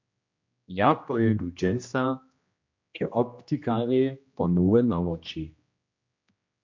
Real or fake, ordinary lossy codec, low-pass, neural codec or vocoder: fake; MP3, 48 kbps; 7.2 kHz; codec, 16 kHz, 1 kbps, X-Codec, HuBERT features, trained on general audio